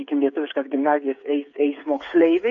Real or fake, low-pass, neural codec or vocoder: fake; 7.2 kHz; codec, 16 kHz, 8 kbps, FreqCodec, smaller model